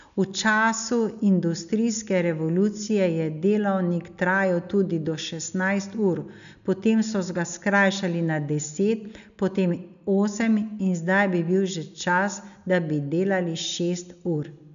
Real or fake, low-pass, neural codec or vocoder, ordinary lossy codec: real; 7.2 kHz; none; MP3, 96 kbps